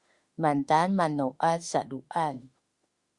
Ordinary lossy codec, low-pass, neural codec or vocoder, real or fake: Opus, 64 kbps; 10.8 kHz; autoencoder, 48 kHz, 32 numbers a frame, DAC-VAE, trained on Japanese speech; fake